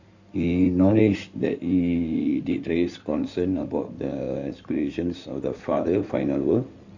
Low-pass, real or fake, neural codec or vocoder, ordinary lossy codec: 7.2 kHz; fake; codec, 16 kHz in and 24 kHz out, 2.2 kbps, FireRedTTS-2 codec; none